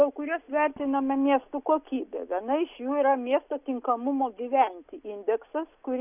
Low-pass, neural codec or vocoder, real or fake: 3.6 kHz; none; real